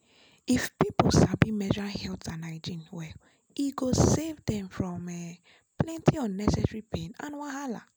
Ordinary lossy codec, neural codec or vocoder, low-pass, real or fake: none; none; none; real